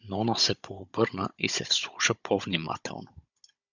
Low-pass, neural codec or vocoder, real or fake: 7.2 kHz; codec, 16 kHz, 16 kbps, FunCodec, trained on Chinese and English, 50 frames a second; fake